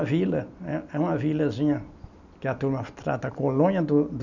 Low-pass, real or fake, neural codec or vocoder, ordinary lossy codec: 7.2 kHz; real; none; none